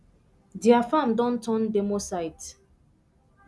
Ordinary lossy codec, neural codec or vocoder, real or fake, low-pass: none; none; real; none